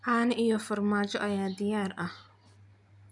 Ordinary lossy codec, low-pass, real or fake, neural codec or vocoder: none; 10.8 kHz; real; none